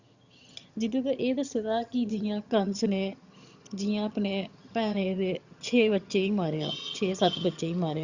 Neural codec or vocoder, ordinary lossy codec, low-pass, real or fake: vocoder, 22.05 kHz, 80 mel bands, HiFi-GAN; Opus, 64 kbps; 7.2 kHz; fake